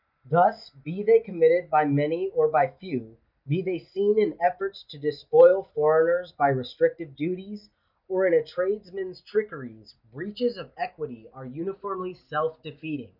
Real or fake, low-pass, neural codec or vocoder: real; 5.4 kHz; none